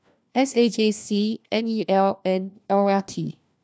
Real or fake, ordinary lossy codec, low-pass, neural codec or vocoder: fake; none; none; codec, 16 kHz, 1 kbps, FunCodec, trained on LibriTTS, 50 frames a second